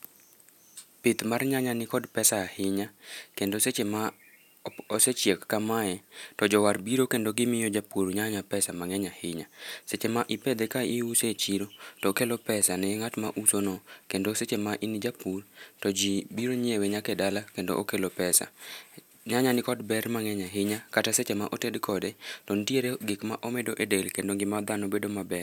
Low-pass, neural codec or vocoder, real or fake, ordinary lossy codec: 19.8 kHz; none; real; none